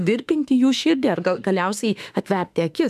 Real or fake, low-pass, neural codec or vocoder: fake; 14.4 kHz; autoencoder, 48 kHz, 32 numbers a frame, DAC-VAE, trained on Japanese speech